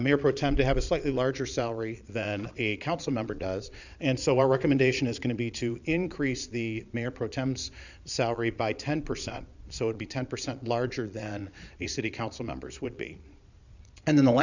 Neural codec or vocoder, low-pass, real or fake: vocoder, 22.05 kHz, 80 mel bands, Vocos; 7.2 kHz; fake